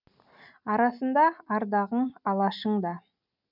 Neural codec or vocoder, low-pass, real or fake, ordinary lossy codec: none; 5.4 kHz; real; none